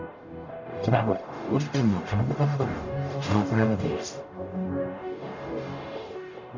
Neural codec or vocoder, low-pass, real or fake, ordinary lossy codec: codec, 44.1 kHz, 0.9 kbps, DAC; 7.2 kHz; fake; none